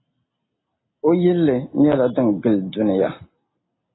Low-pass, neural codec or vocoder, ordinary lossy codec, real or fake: 7.2 kHz; none; AAC, 16 kbps; real